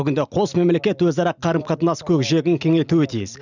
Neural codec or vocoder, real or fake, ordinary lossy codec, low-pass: none; real; none; 7.2 kHz